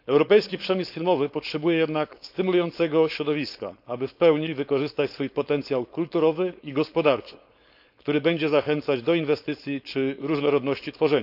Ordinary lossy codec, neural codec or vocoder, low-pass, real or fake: none; codec, 16 kHz, 4.8 kbps, FACodec; 5.4 kHz; fake